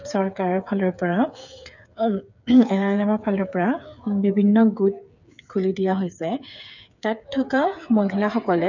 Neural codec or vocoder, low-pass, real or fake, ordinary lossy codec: codec, 16 kHz, 8 kbps, FreqCodec, smaller model; 7.2 kHz; fake; none